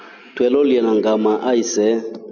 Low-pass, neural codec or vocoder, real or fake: 7.2 kHz; none; real